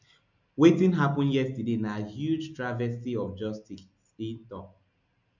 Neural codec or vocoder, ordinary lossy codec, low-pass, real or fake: none; none; 7.2 kHz; real